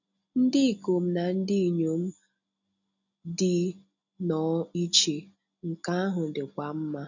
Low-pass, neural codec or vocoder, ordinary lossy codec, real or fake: 7.2 kHz; none; none; real